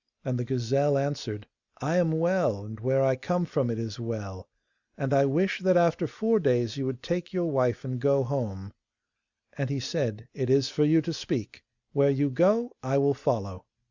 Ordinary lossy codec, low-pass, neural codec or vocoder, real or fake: Opus, 64 kbps; 7.2 kHz; none; real